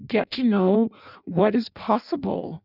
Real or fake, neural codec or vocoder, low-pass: fake; codec, 16 kHz in and 24 kHz out, 0.6 kbps, FireRedTTS-2 codec; 5.4 kHz